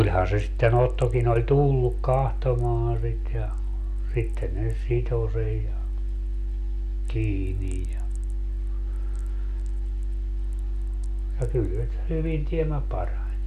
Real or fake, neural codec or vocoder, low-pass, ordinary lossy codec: real; none; 14.4 kHz; none